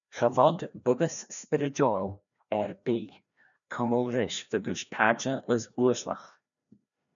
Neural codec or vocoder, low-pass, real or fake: codec, 16 kHz, 1 kbps, FreqCodec, larger model; 7.2 kHz; fake